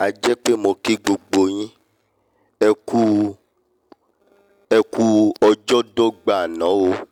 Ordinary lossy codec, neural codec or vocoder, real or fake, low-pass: none; none; real; 19.8 kHz